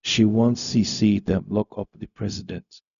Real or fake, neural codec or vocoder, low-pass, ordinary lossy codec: fake; codec, 16 kHz, 0.4 kbps, LongCat-Audio-Codec; 7.2 kHz; none